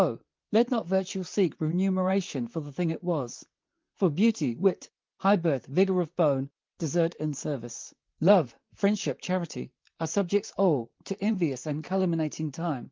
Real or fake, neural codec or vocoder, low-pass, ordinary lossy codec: fake; vocoder, 44.1 kHz, 128 mel bands, Pupu-Vocoder; 7.2 kHz; Opus, 32 kbps